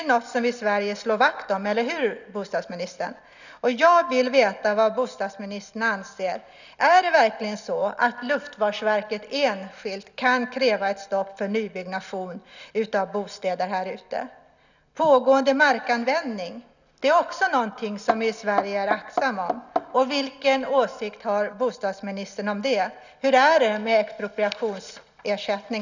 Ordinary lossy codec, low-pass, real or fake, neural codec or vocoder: none; 7.2 kHz; real; none